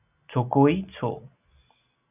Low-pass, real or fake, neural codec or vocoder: 3.6 kHz; real; none